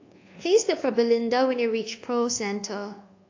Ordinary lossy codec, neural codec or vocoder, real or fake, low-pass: AAC, 48 kbps; codec, 24 kHz, 1.2 kbps, DualCodec; fake; 7.2 kHz